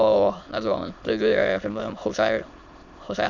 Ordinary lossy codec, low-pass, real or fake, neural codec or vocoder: none; 7.2 kHz; fake; autoencoder, 22.05 kHz, a latent of 192 numbers a frame, VITS, trained on many speakers